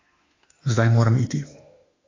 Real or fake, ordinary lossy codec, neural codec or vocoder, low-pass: fake; AAC, 32 kbps; autoencoder, 48 kHz, 32 numbers a frame, DAC-VAE, trained on Japanese speech; 7.2 kHz